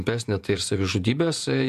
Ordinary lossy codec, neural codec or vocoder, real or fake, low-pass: MP3, 96 kbps; vocoder, 48 kHz, 128 mel bands, Vocos; fake; 14.4 kHz